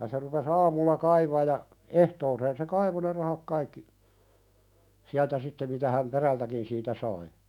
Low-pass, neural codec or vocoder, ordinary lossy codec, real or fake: 19.8 kHz; autoencoder, 48 kHz, 128 numbers a frame, DAC-VAE, trained on Japanese speech; none; fake